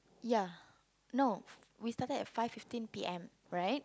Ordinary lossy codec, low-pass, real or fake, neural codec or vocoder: none; none; real; none